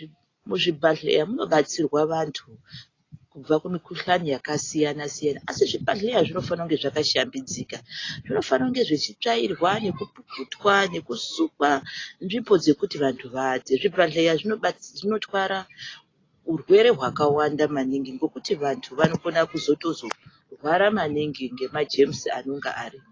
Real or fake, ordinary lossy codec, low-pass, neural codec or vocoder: real; AAC, 32 kbps; 7.2 kHz; none